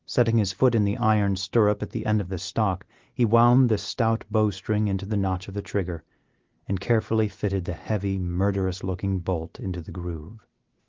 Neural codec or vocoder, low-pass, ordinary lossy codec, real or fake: none; 7.2 kHz; Opus, 16 kbps; real